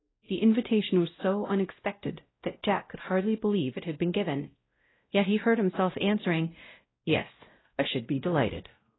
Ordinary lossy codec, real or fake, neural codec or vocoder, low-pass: AAC, 16 kbps; fake; codec, 16 kHz, 0.5 kbps, X-Codec, WavLM features, trained on Multilingual LibriSpeech; 7.2 kHz